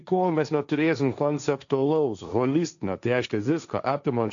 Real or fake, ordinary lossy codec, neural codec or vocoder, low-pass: fake; MP3, 96 kbps; codec, 16 kHz, 1.1 kbps, Voila-Tokenizer; 7.2 kHz